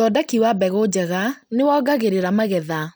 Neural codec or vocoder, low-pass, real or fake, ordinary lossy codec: none; none; real; none